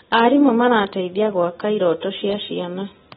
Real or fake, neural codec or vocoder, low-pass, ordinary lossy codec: real; none; 19.8 kHz; AAC, 16 kbps